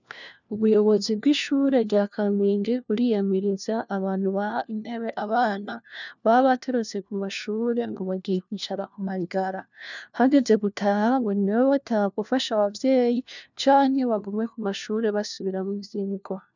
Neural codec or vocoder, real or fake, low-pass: codec, 16 kHz, 1 kbps, FunCodec, trained on LibriTTS, 50 frames a second; fake; 7.2 kHz